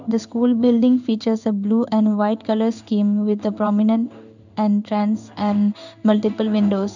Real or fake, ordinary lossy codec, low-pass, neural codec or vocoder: fake; none; 7.2 kHz; codec, 16 kHz in and 24 kHz out, 1 kbps, XY-Tokenizer